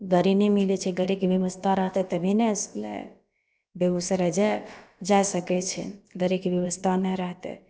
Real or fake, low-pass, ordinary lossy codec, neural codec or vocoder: fake; none; none; codec, 16 kHz, about 1 kbps, DyCAST, with the encoder's durations